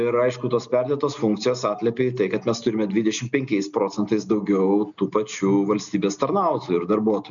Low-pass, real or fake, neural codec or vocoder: 7.2 kHz; real; none